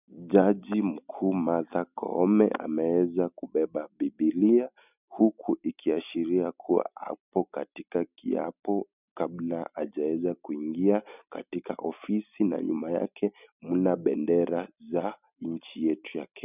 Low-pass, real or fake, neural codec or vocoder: 3.6 kHz; real; none